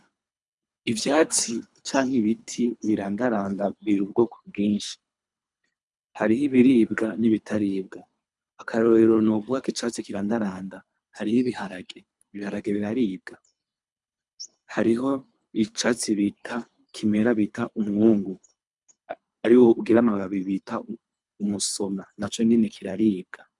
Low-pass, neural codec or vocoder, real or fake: 10.8 kHz; codec, 24 kHz, 3 kbps, HILCodec; fake